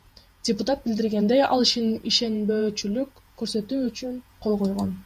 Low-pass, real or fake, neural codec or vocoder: 14.4 kHz; fake; vocoder, 48 kHz, 128 mel bands, Vocos